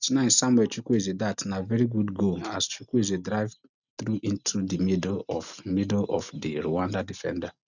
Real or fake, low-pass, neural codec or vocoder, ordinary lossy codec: real; 7.2 kHz; none; none